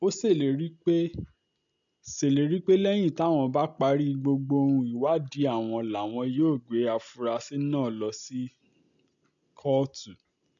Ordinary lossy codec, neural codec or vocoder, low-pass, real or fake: none; none; 7.2 kHz; real